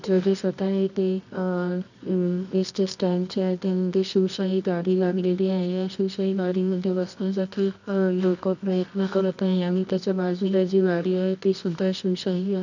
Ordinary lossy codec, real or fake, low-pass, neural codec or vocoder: none; fake; 7.2 kHz; codec, 24 kHz, 0.9 kbps, WavTokenizer, medium music audio release